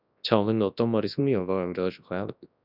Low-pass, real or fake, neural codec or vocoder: 5.4 kHz; fake; codec, 24 kHz, 0.9 kbps, WavTokenizer, large speech release